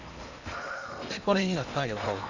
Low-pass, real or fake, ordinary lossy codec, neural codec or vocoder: 7.2 kHz; fake; none; codec, 16 kHz in and 24 kHz out, 0.8 kbps, FocalCodec, streaming, 65536 codes